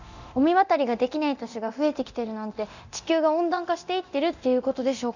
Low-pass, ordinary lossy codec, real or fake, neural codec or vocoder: 7.2 kHz; none; fake; codec, 24 kHz, 0.9 kbps, DualCodec